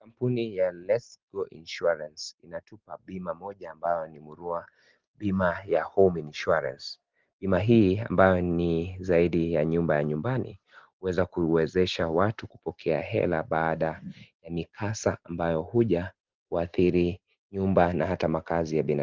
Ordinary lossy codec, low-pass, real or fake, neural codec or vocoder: Opus, 16 kbps; 7.2 kHz; real; none